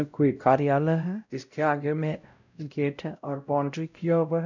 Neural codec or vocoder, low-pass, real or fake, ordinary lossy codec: codec, 16 kHz, 0.5 kbps, X-Codec, WavLM features, trained on Multilingual LibriSpeech; 7.2 kHz; fake; none